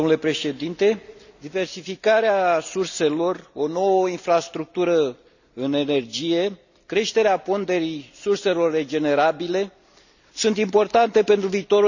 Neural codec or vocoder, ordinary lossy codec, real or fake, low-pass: none; none; real; 7.2 kHz